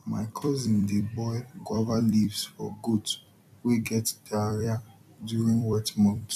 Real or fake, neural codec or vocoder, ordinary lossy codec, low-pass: fake; vocoder, 44.1 kHz, 128 mel bands every 256 samples, BigVGAN v2; none; 14.4 kHz